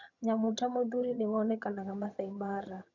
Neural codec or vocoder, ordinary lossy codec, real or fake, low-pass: vocoder, 22.05 kHz, 80 mel bands, HiFi-GAN; none; fake; 7.2 kHz